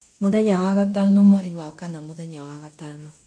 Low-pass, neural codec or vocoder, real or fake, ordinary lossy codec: 9.9 kHz; codec, 16 kHz in and 24 kHz out, 0.9 kbps, LongCat-Audio-Codec, fine tuned four codebook decoder; fake; AAC, 48 kbps